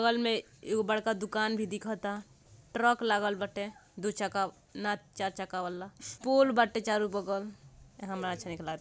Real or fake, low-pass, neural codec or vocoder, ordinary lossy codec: real; none; none; none